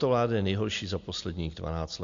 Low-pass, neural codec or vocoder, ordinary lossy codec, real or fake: 7.2 kHz; none; MP3, 48 kbps; real